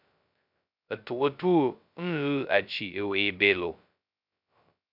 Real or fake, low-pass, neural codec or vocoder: fake; 5.4 kHz; codec, 16 kHz, 0.2 kbps, FocalCodec